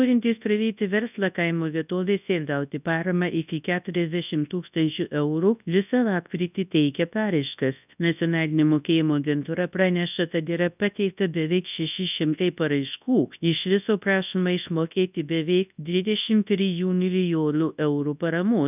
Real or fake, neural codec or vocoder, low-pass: fake; codec, 24 kHz, 0.9 kbps, WavTokenizer, large speech release; 3.6 kHz